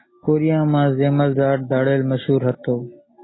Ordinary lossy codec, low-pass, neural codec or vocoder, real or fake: AAC, 16 kbps; 7.2 kHz; none; real